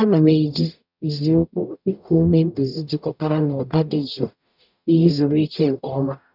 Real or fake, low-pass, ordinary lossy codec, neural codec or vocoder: fake; 5.4 kHz; none; codec, 44.1 kHz, 0.9 kbps, DAC